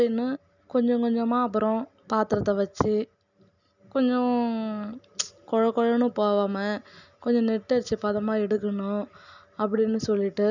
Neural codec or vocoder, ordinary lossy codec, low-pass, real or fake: none; none; 7.2 kHz; real